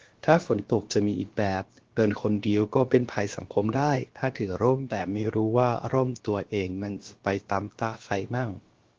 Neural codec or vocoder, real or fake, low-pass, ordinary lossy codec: codec, 16 kHz, about 1 kbps, DyCAST, with the encoder's durations; fake; 7.2 kHz; Opus, 16 kbps